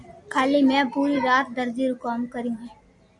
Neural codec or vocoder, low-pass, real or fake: none; 10.8 kHz; real